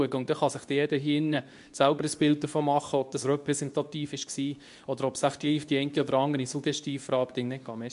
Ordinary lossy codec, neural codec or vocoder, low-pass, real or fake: MP3, 64 kbps; codec, 24 kHz, 0.9 kbps, WavTokenizer, medium speech release version 2; 10.8 kHz; fake